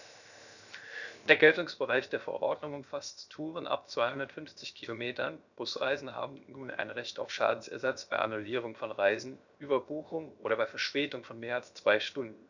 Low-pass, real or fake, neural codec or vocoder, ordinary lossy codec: 7.2 kHz; fake; codec, 16 kHz, 0.7 kbps, FocalCodec; none